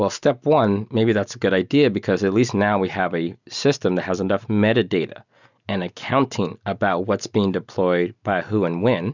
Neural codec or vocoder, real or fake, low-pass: none; real; 7.2 kHz